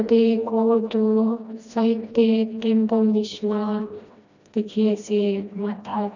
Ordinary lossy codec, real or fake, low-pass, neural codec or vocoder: none; fake; 7.2 kHz; codec, 16 kHz, 1 kbps, FreqCodec, smaller model